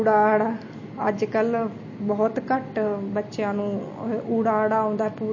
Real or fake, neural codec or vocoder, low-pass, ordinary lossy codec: real; none; 7.2 kHz; MP3, 32 kbps